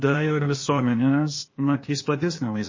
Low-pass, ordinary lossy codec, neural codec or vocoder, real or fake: 7.2 kHz; MP3, 32 kbps; codec, 16 kHz, 0.8 kbps, ZipCodec; fake